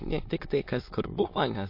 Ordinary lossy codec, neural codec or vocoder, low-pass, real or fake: MP3, 32 kbps; autoencoder, 22.05 kHz, a latent of 192 numbers a frame, VITS, trained on many speakers; 5.4 kHz; fake